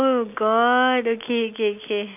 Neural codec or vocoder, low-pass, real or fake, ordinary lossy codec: none; 3.6 kHz; real; none